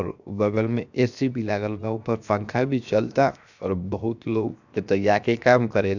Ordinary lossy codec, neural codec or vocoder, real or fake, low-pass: none; codec, 16 kHz, 0.7 kbps, FocalCodec; fake; 7.2 kHz